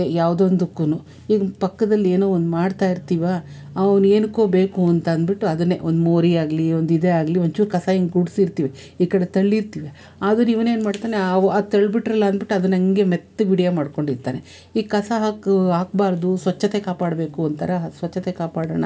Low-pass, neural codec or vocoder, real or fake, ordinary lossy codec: none; none; real; none